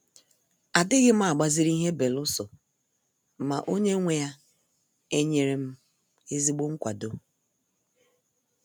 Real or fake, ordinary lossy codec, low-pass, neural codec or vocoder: real; none; none; none